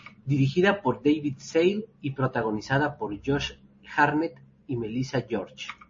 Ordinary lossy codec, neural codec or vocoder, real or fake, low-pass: MP3, 32 kbps; none; real; 7.2 kHz